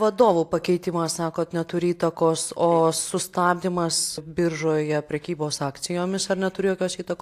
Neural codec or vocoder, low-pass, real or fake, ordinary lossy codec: none; 14.4 kHz; real; AAC, 64 kbps